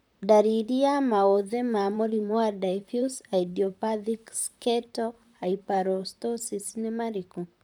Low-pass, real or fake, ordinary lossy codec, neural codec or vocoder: none; fake; none; vocoder, 44.1 kHz, 128 mel bands, Pupu-Vocoder